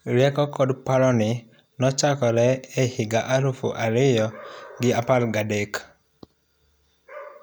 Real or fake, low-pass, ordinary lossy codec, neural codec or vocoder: real; none; none; none